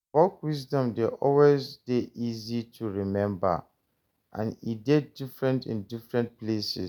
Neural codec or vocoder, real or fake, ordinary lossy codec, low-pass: none; real; none; 19.8 kHz